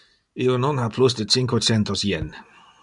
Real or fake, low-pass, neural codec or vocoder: real; 10.8 kHz; none